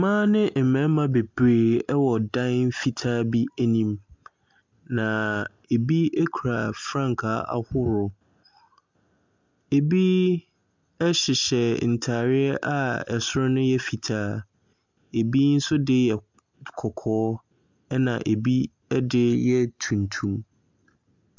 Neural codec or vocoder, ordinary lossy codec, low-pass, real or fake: none; MP3, 64 kbps; 7.2 kHz; real